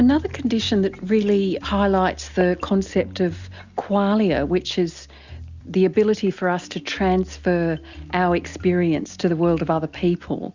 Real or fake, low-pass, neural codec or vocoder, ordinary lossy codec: real; 7.2 kHz; none; Opus, 64 kbps